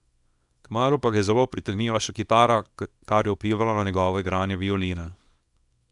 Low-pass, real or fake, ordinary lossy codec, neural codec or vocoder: 10.8 kHz; fake; none; codec, 24 kHz, 0.9 kbps, WavTokenizer, small release